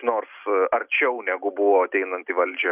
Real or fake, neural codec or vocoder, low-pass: real; none; 3.6 kHz